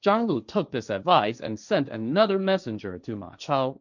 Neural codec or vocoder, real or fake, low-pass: codec, 16 kHz, 1.1 kbps, Voila-Tokenizer; fake; 7.2 kHz